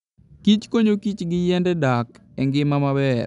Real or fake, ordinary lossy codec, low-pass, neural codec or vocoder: real; none; 10.8 kHz; none